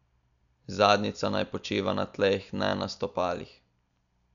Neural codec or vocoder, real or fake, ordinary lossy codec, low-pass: none; real; none; 7.2 kHz